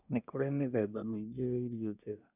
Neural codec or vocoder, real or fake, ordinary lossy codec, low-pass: codec, 24 kHz, 1 kbps, SNAC; fake; none; 3.6 kHz